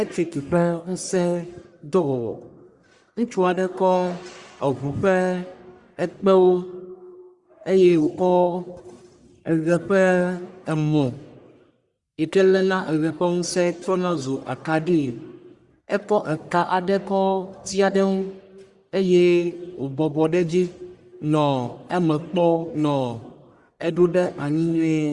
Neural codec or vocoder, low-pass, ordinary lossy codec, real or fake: codec, 44.1 kHz, 1.7 kbps, Pupu-Codec; 10.8 kHz; Opus, 64 kbps; fake